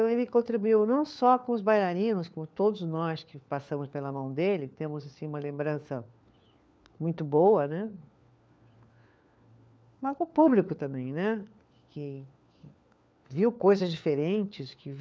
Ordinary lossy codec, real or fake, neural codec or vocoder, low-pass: none; fake; codec, 16 kHz, 4 kbps, FunCodec, trained on LibriTTS, 50 frames a second; none